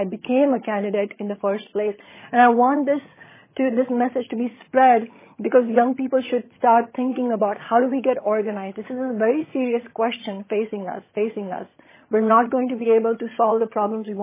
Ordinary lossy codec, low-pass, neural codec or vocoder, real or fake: MP3, 16 kbps; 3.6 kHz; vocoder, 22.05 kHz, 80 mel bands, HiFi-GAN; fake